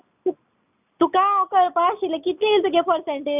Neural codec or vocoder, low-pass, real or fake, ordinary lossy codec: none; 3.6 kHz; real; none